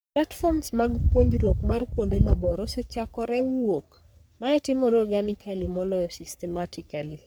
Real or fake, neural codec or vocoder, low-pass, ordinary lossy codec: fake; codec, 44.1 kHz, 3.4 kbps, Pupu-Codec; none; none